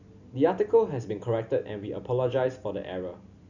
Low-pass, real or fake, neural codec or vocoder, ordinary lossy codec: 7.2 kHz; real; none; none